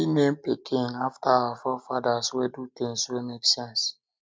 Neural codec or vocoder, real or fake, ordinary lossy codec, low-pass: none; real; none; none